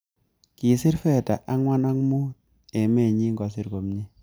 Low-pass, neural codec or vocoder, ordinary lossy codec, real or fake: none; none; none; real